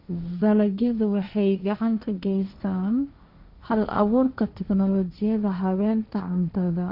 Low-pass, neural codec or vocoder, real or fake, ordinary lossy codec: 5.4 kHz; codec, 16 kHz, 1.1 kbps, Voila-Tokenizer; fake; none